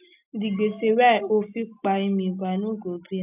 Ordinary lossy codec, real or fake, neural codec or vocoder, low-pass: none; real; none; 3.6 kHz